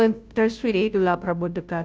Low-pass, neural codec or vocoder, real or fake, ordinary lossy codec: none; codec, 16 kHz, 0.5 kbps, FunCodec, trained on Chinese and English, 25 frames a second; fake; none